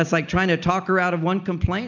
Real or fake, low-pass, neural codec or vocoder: real; 7.2 kHz; none